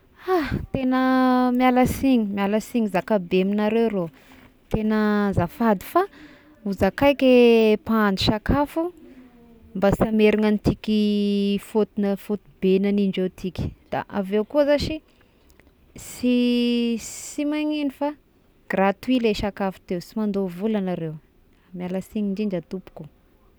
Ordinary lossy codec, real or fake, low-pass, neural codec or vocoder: none; real; none; none